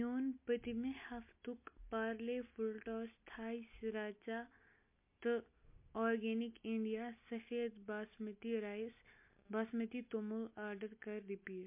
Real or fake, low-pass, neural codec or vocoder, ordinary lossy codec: real; 3.6 kHz; none; MP3, 24 kbps